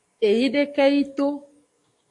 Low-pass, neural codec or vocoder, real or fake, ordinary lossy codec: 10.8 kHz; codec, 44.1 kHz, 7.8 kbps, DAC; fake; AAC, 48 kbps